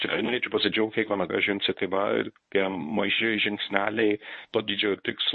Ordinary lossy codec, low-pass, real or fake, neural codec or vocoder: MP3, 32 kbps; 10.8 kHz; fake; codec, 24 kHz, 0.9 kbps, WavTokenizer, medium speech release version 1